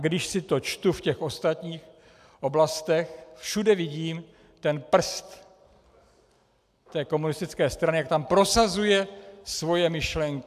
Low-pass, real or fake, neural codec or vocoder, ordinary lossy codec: 14.4 kHz; real; none; AAC, 96 kbps